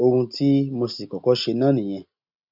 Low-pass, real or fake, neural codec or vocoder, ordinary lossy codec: 5.4 kHz; real; none; none